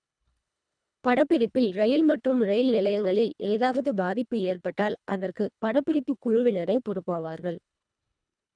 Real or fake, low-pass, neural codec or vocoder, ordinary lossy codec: fake; 9.9 kHz; codec, 24 kHz, 1.5 kbps, HILCodec; none